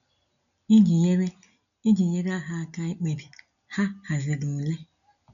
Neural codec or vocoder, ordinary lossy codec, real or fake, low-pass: none; none; real; 7.2 kHz